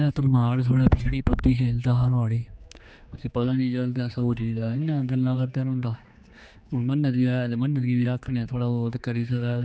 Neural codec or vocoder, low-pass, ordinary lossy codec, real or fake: codec, 16 kHz, 2 kbps, X-Codec, HuBERT features, trained on general audio; none; none; fake